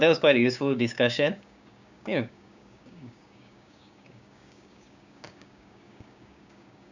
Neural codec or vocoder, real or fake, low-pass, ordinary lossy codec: codec, 16 kHz, 6 kbps, DAC; fake; 7.2 kHz; none